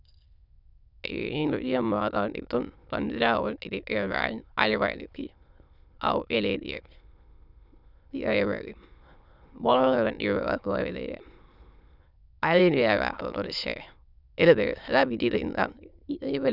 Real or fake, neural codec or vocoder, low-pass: fake; autoencoder, 22.05 kHz, a latent of 192 numbers a frame, VITS, trained on many speakers; 5.4 kHz